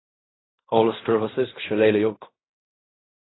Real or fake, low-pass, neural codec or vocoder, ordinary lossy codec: fake; 7.2 kHz; codec, 16 kHz in and 24 kHz out, 0.4 kbps, LongCat-Audio-Codec, fine tuned four codebook decoder; AAC, 16 kbps